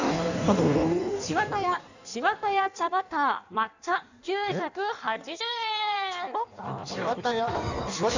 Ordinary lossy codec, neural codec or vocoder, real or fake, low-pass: none; codec, 16 kHz in and 24 kHz out, 1.1 kbps, FireRedTTS-2 codec; fake; 7.2 kHz